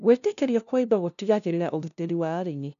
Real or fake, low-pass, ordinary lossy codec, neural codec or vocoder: fake; 7.2 kHz; none; codec, 16 kHz, 0.5 kbps, FunCodec, trained on LibriTTS, 25 frames a second